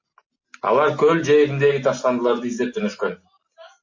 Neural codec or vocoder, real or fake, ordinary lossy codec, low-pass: none; real; MP3, 32 kbps; 7.2 kHz